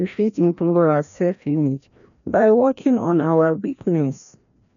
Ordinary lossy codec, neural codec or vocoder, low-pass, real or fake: none; codec, 16 kHz, 1 kbps, FreqCodec, larger model; 7.2 kHz; fake